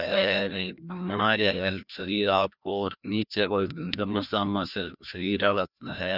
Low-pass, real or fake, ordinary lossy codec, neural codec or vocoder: 5.4 kHz; fake; none; codec, 16 kHz, 1 kbps, FreqCodec, larger model